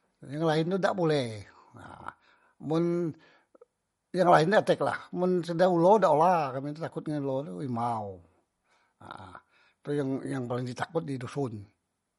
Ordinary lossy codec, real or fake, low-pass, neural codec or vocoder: MP3, 48 kbps; real; 14.4 kHz; none